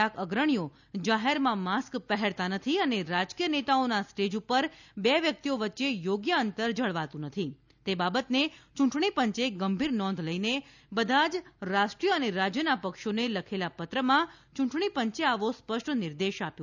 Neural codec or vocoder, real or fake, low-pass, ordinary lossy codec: none; real; 7.2 kHz; none